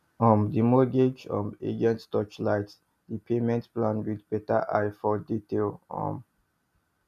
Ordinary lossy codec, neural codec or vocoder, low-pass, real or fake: none; none; 14.4 kHz; real